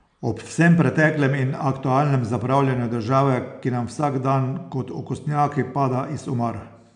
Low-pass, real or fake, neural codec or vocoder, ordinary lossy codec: 9.9 kHz; real; none; none